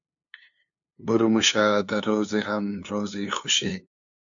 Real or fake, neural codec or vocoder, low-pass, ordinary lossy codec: fake; codec, 16 kHz, 2 kbps, FunCodec, trained on LibriTTS, 25 frames a second; 7.2 kHz; AAC, 64 kbps